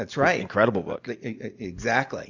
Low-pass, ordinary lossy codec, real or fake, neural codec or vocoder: 7.2 kHz; Opus, 64 kbps; real; none